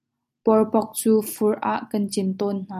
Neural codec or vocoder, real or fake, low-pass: none; real; 14.4 kHz